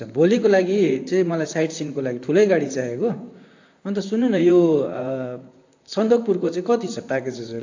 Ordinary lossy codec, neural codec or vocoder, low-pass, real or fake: AAC, 48 kbps; vocoder, 44.1 kHz, 128 mel bands, Pupu-Vocoder; 7.2 kHz; fake